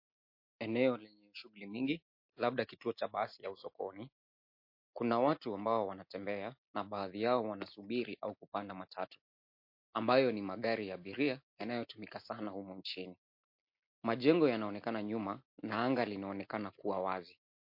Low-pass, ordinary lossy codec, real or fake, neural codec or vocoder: 5.4 kHz; MP3, 32 kbps; real; none